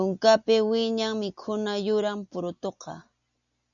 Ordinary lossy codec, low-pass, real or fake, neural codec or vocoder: MP3, 96 kbps; 7.2 kHz; real; none